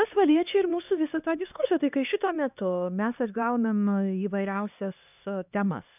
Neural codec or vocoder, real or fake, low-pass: codec, 16 kHz, 2 kbps, X-Codec, HuBERT features, trained on LibriSpeech; fake; 3.6 kHz